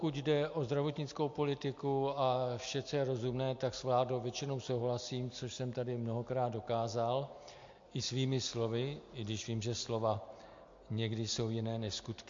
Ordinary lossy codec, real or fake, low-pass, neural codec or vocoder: MP3, 48 kbps; real; 7.2 kHz; none